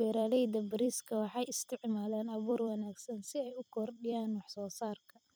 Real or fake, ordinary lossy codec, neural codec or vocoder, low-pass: fake; none; vocoder, 44.1 kHz, 128 mel bands every 256 samples, BigVGAN v2; none